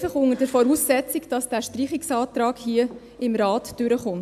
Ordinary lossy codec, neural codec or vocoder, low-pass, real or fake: AAC, 96 kbps; none; 14.4 kHz; real